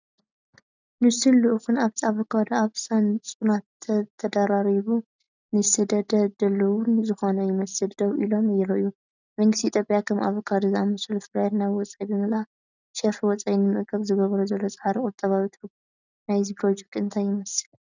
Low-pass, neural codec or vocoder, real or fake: 7.2 kHz; none; real